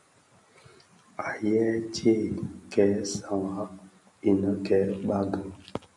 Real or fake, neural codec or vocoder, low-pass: real; none; 10.8 kHz